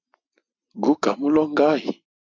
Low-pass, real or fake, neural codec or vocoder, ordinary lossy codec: 7.2 kHz; real; none; AAC, 48 kbps